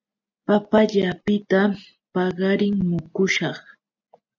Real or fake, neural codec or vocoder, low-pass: real; none; 7.2 kHz